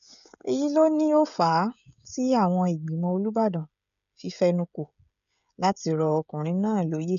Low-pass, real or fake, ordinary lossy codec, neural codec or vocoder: 7.2 kHz; fake; none; codec, 16 kHz, 16 kbps, FreqCodec, smaller model